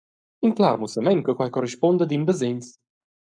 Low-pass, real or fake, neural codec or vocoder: 9.9 kHz; fake; codec, 44.1 kHz, 7.8 kbps, Pupu-Codec